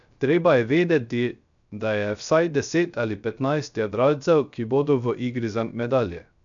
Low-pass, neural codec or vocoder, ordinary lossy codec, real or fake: 7.2 kHz; codec, 16 kHz, 0.3 kbps, FocalCodec; none; fake